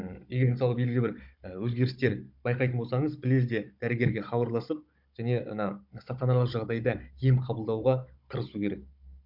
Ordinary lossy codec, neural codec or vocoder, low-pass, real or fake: MP3, 48 kbps; codec, 16 kHz, 16 kbps, FunCodec, trained on Chinese and English, 50 frames a second; 5.4 kHz; fake